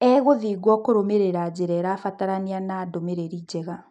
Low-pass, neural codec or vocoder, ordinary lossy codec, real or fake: 10.8 kHz; none; none; real